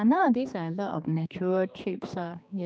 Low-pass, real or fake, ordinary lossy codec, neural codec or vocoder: none; fake; none; codec, 16 kHz, 2 kbps, X-Codec, HuBERT features, trained on general audio